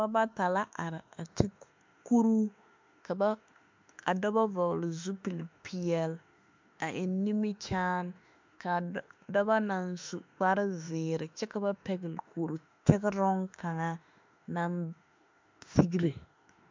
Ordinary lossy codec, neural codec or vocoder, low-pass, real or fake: MP3, 64 kbps; autoencoder, 48 kHz, 32 numbers a frame, DAC-VAE, trained on Japanese speech; 7.2 kHz; fake